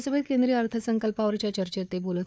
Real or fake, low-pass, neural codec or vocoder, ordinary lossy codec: fake; none; codec, 16 kHz, 4 kbps, FunCodec, trained on Chinese and English, 50 frames a second; none